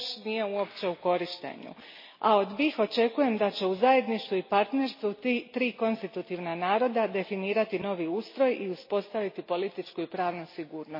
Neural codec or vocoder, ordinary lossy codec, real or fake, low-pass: none; MP3, 24 kbps; real; 5.4 kHz